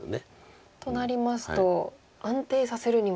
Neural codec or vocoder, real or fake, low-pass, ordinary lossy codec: none; real; none; none